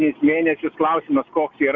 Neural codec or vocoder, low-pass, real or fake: none; 7.2 kHz; real